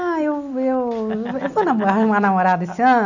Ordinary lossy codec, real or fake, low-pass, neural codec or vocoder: none; real; 7.2 kHz; none